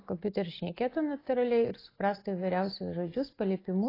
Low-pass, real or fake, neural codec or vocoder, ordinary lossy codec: 5.4 kHz; real; none; AAC, 24 kbps